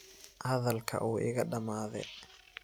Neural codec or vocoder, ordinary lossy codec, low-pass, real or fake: none; none; none; real